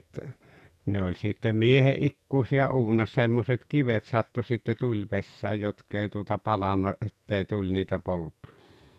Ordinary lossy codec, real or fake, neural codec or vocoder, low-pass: none; fake; codec, 44.1 kHz, 2.6 kbps, SNAC; 14.4 kHz